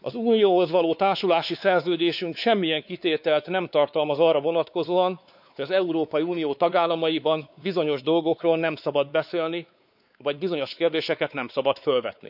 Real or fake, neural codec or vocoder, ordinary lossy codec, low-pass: fake; codec, 16 kHz, 4 kbps, X-Codec, WavLM features, trained on Multilingual LibriSpeech; none; 5.4 kHz